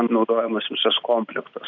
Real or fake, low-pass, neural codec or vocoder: real; 7.2 kHz; none